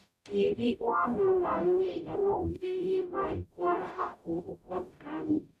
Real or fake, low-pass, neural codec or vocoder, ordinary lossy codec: fake; 14.4 kHz; codec, 44.1 kHz, 0.9 kbps, DAC; none